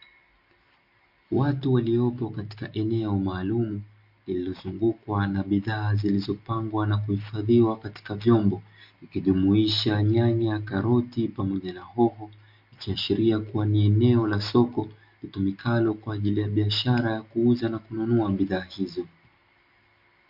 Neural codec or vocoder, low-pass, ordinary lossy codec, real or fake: none; 5.4 kHz; MP3, 48 kbps; real